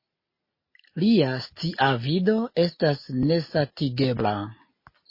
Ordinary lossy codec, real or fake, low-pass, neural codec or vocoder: MP3, 24 kbps; real; 5.4 kHz; none